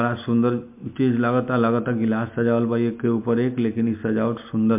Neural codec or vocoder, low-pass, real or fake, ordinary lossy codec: none; 3.6 kHz; real; MP3, 32 kbps